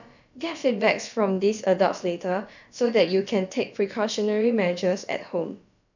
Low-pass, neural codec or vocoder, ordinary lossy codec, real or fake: 7.2 kHz; codec, 16 kHz, about 1 kbps, DyCAST, with the encoder's durations; none; fake